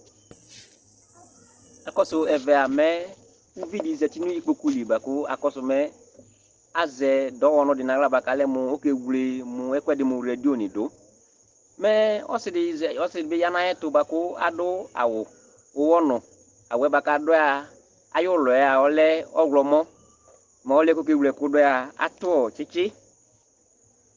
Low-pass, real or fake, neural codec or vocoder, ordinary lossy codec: 7.2 kHz; real; none; Opus, 16 kbps